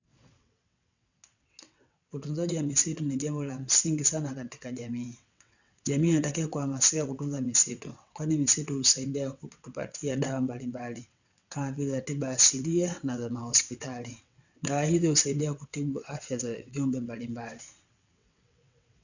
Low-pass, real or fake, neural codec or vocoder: 7.2 kHz; fake; vocoder, 22.05 kHz, 80 mel bands, WaveNeXt